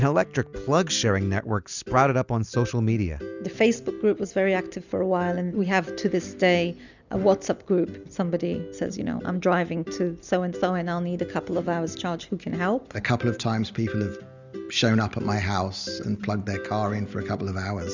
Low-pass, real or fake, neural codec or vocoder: 7.2 kHz; real; none